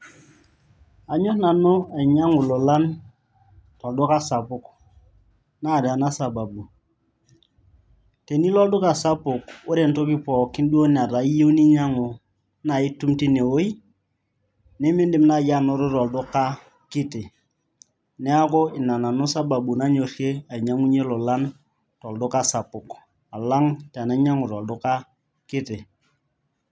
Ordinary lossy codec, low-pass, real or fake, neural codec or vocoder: none; none; real; none